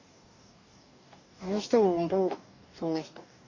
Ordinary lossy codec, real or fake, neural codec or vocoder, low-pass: none; fake; codec, 44.1 kHz, 2.6 kbps, DAC; 7.2 kHz